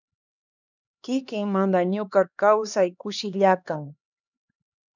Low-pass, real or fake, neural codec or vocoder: 7.2 kHz; fake; codec, 16 kHz, 2 kbps, X-Codec, HuBERT features, trained on LibriSpeech